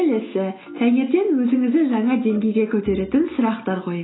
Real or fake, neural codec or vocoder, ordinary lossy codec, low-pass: real; none; AAC, 16 kbps; 7.2 kHz